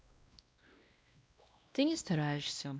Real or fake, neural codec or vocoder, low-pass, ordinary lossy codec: fake; codec, 16 kHz, 1 kbps, X-Codec, WavLM features, trained on Multilingual LibriSpeech; none; none